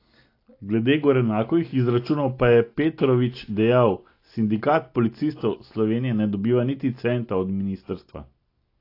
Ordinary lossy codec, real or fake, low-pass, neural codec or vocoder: AAC, 32 kbps; real; 5.4 kHz; none